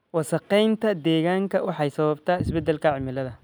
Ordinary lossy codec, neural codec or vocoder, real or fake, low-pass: none; none; real; none